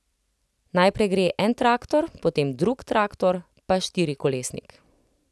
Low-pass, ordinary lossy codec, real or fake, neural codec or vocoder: none; none; real; none